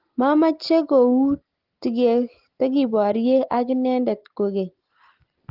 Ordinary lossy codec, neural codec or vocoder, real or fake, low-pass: Opus, 16 kbps; none; real; 5.4 kHz